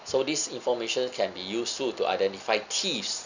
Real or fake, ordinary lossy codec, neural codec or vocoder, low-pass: real; none; none; 7.2 kHz